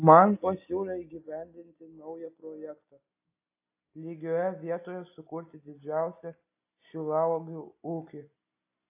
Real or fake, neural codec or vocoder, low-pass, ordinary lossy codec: real; none; 3.6 kHz; AAC, 24 kbps